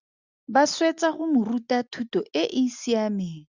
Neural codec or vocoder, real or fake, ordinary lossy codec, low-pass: none; real; Opus, 64 kbps; 7.2 kHz